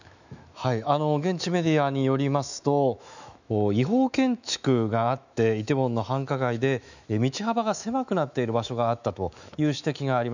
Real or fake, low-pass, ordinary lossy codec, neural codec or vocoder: fake; 7.2 kHz; none; autoencoder, 48 kHz, 128 numbers a frame, DAC-VAE, trained on Japanese speech